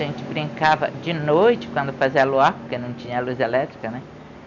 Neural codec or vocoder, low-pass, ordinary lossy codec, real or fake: none; 7.2 kHz; none; real